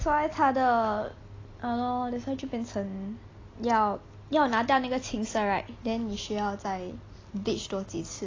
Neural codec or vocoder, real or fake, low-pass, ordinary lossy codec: none; real; 7.2 kHz; AAC, 32 kbps